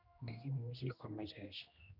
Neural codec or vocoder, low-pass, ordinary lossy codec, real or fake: codec, 16 kHz, 1 kbps, X-Codec, HuBERT features, trained on general audio; 5.4 kHz; none; fake